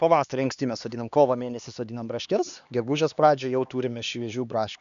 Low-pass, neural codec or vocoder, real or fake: 7.2 kHz; codec, 16 kHz, 2 kbps, X-Codec, HuBERT features, trained on LibriSpeech; fake